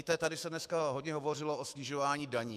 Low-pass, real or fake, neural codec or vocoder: 14.4 kHz; fake; autoencoder, 48 kHz, 128 numbers a frame, DAC-VAE, trained on Japanese speech